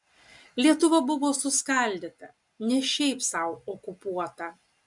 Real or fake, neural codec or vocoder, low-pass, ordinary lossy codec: real; none; 10.8 kHz; MP3, 64 kbps